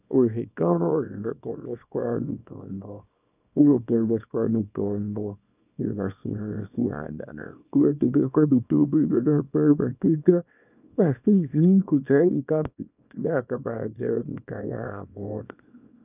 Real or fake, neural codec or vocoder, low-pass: fake; codec, 24 kHz, 0.9 kbps, WavTokenizer, small release; 3.6 kHz